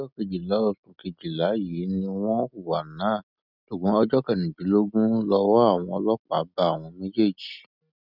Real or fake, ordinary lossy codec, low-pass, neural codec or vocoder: real; none; 5.4 kHz; none